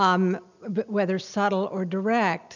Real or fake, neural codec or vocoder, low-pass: real; none; 7.2 kHz